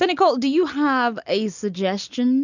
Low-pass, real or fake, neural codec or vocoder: 7.2 kHz; real; none